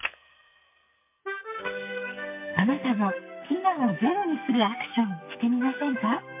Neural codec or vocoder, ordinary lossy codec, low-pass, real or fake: codec, 44.1 kHz, 2.6 kbps, SNAC; MP3, 32 kbps; 3.6 kHz; fake